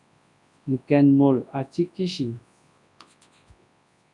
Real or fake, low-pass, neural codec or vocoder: fake; 10.8 kHz; codec, 24 kHz, 0.9 kbps, WavTokenizer, large speech release